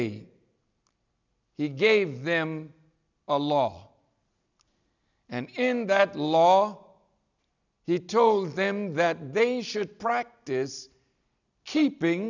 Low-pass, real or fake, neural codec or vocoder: 7.2 kHz; real; none